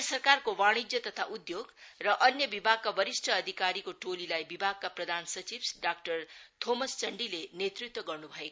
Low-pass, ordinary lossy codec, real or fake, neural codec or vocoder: none; none; real; none